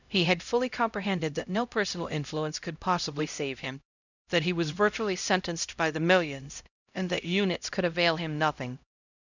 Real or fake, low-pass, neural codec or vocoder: fake; 7.2 kHz; codec, 16 kHz, 0.5 kbps, X-Codec, HuBERT features, trained on LibriSpeech